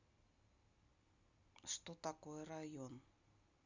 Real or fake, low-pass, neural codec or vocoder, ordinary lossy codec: real; 7.2 kHz; none; Opus, 64 kbps